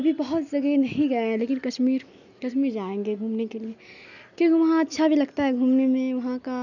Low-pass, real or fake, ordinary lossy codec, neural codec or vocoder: 7.2 kHz; real; none; none